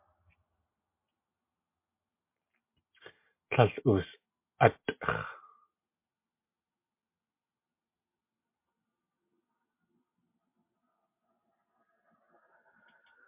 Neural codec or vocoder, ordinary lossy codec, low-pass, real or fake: none; MP3, 24 kbps; 3.6 kHz; real